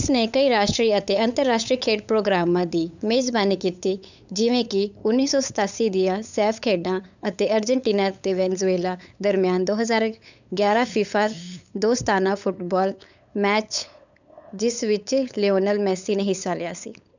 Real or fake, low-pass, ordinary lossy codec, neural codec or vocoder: fake; 7.2 kHz; none; codec, 16 kHz, 8 kbps, FunCodec, trained on LibriTTS, 25 frames a second